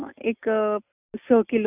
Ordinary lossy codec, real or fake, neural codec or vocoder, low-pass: none; real; none; 3.6 kHz